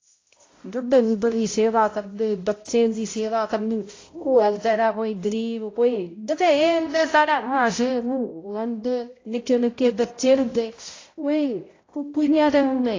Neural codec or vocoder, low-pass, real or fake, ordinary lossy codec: codec, 16 kHz, 0.5 kbps, X-Codec, HuBERT features, trained on balanced general audio; 7.2 kHz; fake; AAC, 32 kbps